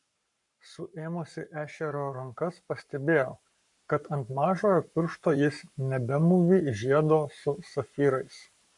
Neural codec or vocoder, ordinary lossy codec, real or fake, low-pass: codec, 44.1 kHz, 7.8 kbps, Pupu-Codec; MP3, 64 kbps; fake; 10.8 kHz